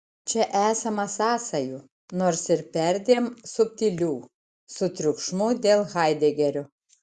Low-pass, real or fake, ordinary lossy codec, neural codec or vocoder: 10.8 kHz; real; Opus, 64 kbps; none